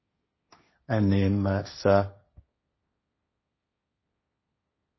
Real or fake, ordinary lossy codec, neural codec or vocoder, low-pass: fake; MP3, 24 kbps; codec, 16 kHz, 1.1 kbps, Voila-Tokenizer; 7.2 kHz